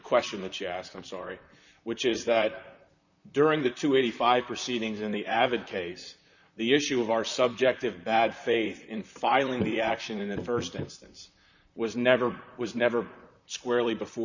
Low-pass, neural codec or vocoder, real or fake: 7.2 kHz; vocoder, 44.1 kHz, 128 mel bands, Pupu-Vocoder; fake